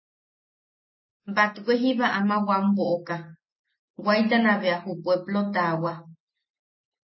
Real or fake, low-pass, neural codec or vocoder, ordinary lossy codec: real; 7.2 kHz; none; MP3, 24 kbps